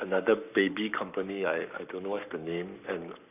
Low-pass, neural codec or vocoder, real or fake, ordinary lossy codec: 3.6 kHz; codec, 44.1 kHz, 7.8 kbps, Pupu-Codec; fake; none